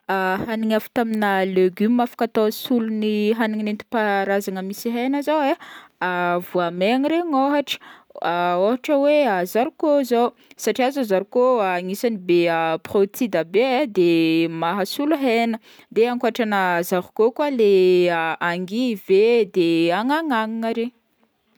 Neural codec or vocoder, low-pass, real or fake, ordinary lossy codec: none; none; real; none